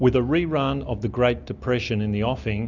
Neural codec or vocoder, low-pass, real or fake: none; 7.2 kHz; real